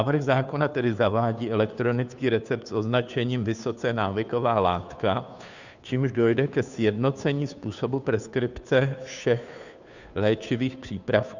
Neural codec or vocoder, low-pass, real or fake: codec, 16 kHz, 2 kbps, FunCodec, trained on Chinese and English, 25 frames a second; 7.2 kHz; fake